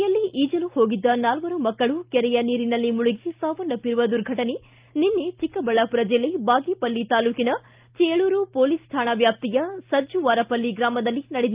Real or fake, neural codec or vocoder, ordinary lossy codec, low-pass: real; none; Opus, 24 kbps; 3.6 kHz